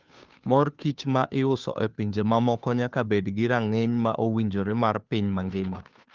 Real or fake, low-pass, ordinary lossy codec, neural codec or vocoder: fake; 7.2 kHz; Opus, 32 kbps; codec, 16 kHz, 2 kbps, FunCodec, trained on Chinese and English, 25 frames a second